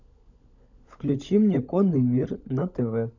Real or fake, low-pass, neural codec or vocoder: fake; 7.2 kHz; codec, 16 kHz, 16 kbps, FunCodec, trained on LibriTTS, 50 frames a second